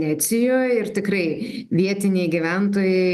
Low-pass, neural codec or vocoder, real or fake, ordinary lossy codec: 14.4 kHz; none; real; Opus, 32 kbps